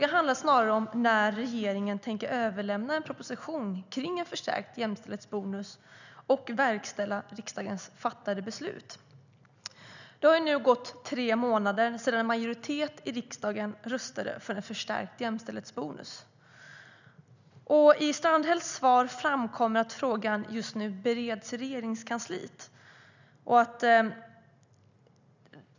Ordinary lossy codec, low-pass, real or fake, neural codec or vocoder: none; 7.2 kHz; real; none